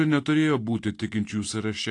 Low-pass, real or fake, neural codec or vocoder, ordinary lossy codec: 10.8 kHz; real; none; AAC, 48 kbps